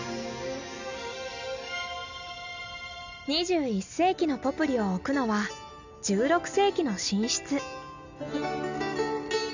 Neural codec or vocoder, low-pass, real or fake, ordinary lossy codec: none; 7.2 kHz; real; none